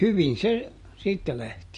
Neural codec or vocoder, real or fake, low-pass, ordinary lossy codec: none; real; 14.4 kHz; MP3, 48 kbps